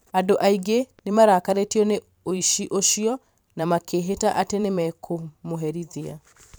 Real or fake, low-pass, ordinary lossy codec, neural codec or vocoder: fake; none; none; vocoder, 44.1 kHz, 128 mel bands every 512 samples, BigVGAN v2